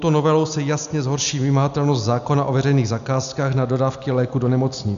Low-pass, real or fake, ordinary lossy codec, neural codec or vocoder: 7.2 kHz; real; MP3, 96 kbps; none